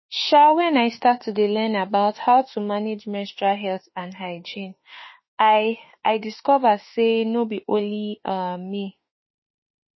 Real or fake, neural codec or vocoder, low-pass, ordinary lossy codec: fake; autoencoder, 48 kHz, 32 numbers a frame, DAC-VAE, trained on Japanese speech; 7.2 kHz; MP3, 24 kbps